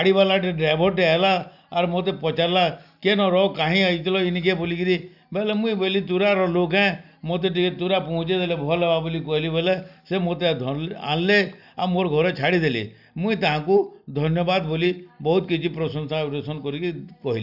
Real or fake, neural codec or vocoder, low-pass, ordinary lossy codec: real; none; 5.4 kHz; none